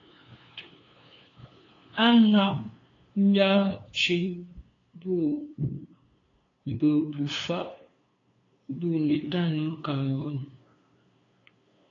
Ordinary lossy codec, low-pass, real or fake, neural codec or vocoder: AAC, 32 kbps; 7.2 kHz; fake; codec, 16 kHz, 2 kbps, FunCodec, trained on LibriTTS, 25 frames a second